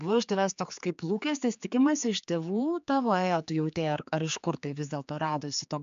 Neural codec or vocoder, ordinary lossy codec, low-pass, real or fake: codec, 16 kHz, 4 kbps, X-Codec, HuBERT features, trained on general audio; MP3, 64 kbps; 7.2 kHz; fake